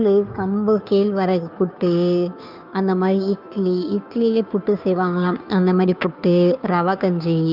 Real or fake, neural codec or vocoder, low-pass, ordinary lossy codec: fake; codec, 16 kHz, 2 kbps, FunCodec, trained on Chinese and English, 25 frames a second; 5.4 kHz; none